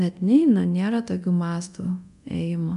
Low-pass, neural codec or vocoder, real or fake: 10.8 kHz; codec, 24 kHz, 0.9 kbps, DualCodec; fake